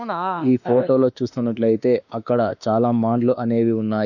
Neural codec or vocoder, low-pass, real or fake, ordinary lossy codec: autoencoder, 48 kHz, 32 numbers a frame, DAC-VAE, trained on Japanese speech; 7.2 kHz; fake; none